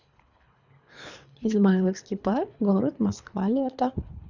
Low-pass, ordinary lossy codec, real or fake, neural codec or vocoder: 7.2 kHz; none; fake; codec, 24 kHz, 3 kbps, HILCodec